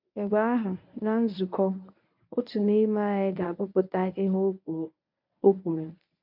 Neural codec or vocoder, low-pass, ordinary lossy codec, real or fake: codec, 24 kHz, 0.9 kbps, WavTokenizer, medium speech release version 1; 5.4 kHz; MP3, 48 kbps; fake